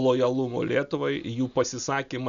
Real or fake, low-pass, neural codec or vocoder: real; 7.2 kHz; none